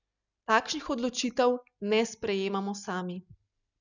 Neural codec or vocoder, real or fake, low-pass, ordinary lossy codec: none; real; 7.2 kHz; none